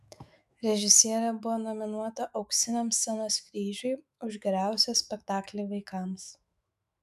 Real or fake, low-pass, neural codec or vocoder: fake; 14.4 kHz; autoencoder, 48 kHz, 128 numbers a frame, DAC-VAE, trained on Japanese speech